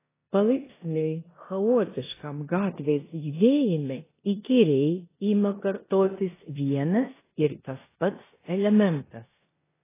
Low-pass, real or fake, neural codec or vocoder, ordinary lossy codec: 3.6 kHz; fake; codec, 16 kHz in and 24 kHz out, 0.9 kbps, LongCat-Audio-Codec, four codebook decoder; AAC, 16 kbps